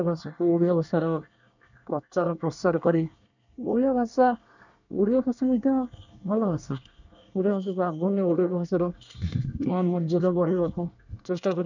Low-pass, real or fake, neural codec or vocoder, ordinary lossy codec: 7.2 kHz; fake; codec, 24 kHz, 1 kbps, SNAC; none